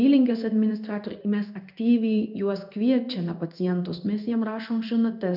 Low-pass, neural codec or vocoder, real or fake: 5.4 kHz; codec, 16 kHz in and 24 kHz out, 1 kbps, XY-Tokenizer; fake